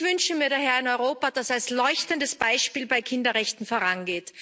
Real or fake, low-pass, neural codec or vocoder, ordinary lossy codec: real; none; none; none